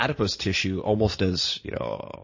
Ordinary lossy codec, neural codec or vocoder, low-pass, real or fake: MP3, 32 kbps; vocoder, 44.1 kHz, 128 mel bands every 512 samples, BigVGAN v2; 7.2 kHz; fake